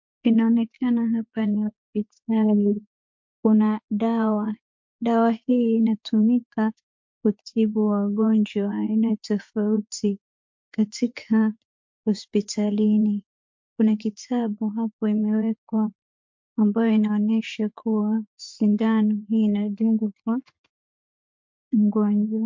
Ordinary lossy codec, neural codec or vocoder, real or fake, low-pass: MP3, 48 kbps; vocoder, 24 kHz, 100 mel bands, Vocos; fake; 7.2 kHz